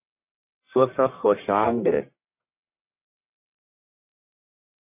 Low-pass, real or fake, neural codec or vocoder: 3.6 kHz; fake; codec, 44.1 kHz, 1.7 kbps, Pupu-Codec